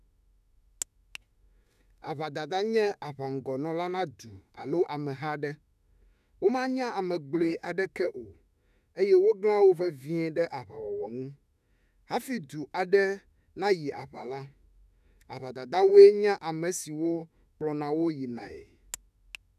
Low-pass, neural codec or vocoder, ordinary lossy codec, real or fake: 14.4 kHz; autoencoder, 48 kHz, 32 numbers a frame, DAC-VAE, trained on Japanese speech; none; fake